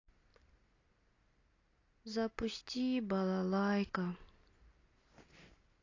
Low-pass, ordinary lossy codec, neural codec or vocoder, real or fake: 7.2 kHz; AAC, 32 kbps; none; real